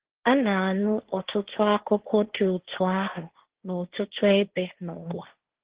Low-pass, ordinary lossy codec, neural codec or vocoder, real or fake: 3.6 kHz; Opus, 16 kbps; codec, 16 kHz, 1.1 kbps, Voila-Tokenizer; fake